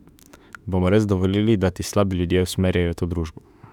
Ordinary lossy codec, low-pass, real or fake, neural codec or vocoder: none; 19.8 kHz; fake; autoencoder, 48 kHz, 32 numbers a frame, DAC-VAE, trained on Japanese speech